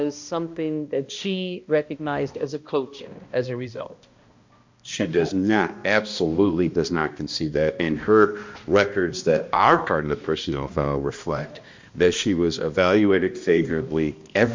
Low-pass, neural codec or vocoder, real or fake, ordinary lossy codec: 7.2 kHz; codec, 16 kHz, 1 kbps, X-Codec, HuBERT features, trained on balanced general audio; fake; MP3, 48 kbps